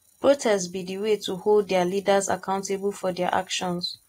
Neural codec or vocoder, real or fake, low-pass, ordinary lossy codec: none; real; 19.8 kHz; AAC, 48 kbps